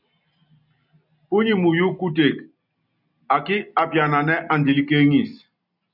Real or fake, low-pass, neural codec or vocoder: real; 5.4 kHz; none